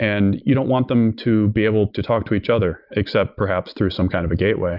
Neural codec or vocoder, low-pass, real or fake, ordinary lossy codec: none; 5.4 kHz; real; Opus, 64 kbps